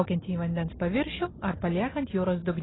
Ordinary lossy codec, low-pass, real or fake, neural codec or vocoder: AAC, 16 kbps; 7.2 kHz; real; none